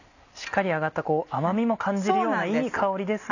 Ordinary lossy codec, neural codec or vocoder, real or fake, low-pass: none; none; real; 7.2 kHz